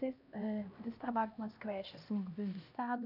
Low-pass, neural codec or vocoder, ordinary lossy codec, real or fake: 5.4 kHz; codec, 16 kHz, 1 kbps, X-Codec, HuBERT features, trained on LibriSpeech; none; fake